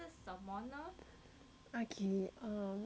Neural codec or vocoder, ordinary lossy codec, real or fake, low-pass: none; none; real; none